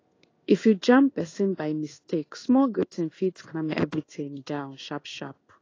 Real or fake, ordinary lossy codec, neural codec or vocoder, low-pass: fake; AAC, 32 kbps; codec, 16 kHz in and 24 kHz out, 1 kbps, XY-Tokenizer; 7.2 kHz